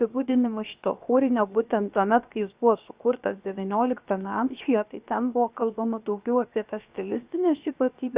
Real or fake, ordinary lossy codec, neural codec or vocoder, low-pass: fake; Opus, 64 kbps; codec, 16 kHz, 0.7 kbps, FocalCodec; 3.6 kHz